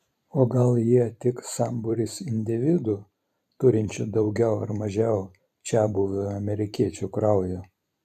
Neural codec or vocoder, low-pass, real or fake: vocoder, 44.1 kHz, 128 mel bands every 512 samples, BigVGAN v2; 14.4 kHz; fake